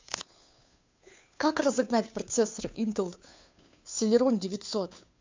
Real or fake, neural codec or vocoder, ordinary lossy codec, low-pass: fake; codec, 16 kHz, 2 kbps, FunCodec, trained on Chinese and English, 25 frames a second; MP3, 64 kbps; 7.2 kHz